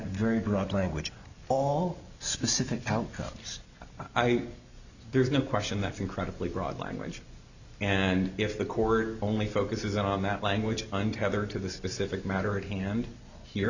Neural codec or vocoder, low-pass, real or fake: none; 7.2 kHz; real